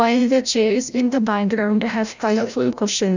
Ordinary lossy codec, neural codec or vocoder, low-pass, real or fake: none; codec, 16 kHz, 0.5 kbps, FreqCodec, larger model; 7.2 kHz; fake